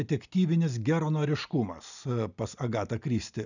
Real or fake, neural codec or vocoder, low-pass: real; none; 7.2 kHz